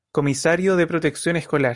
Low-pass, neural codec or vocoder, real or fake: 10.8 kHz; none; real